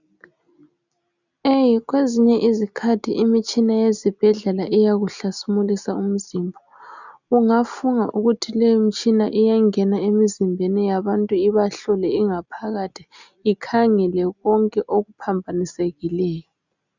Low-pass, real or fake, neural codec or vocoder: 7.2 kHz; real; none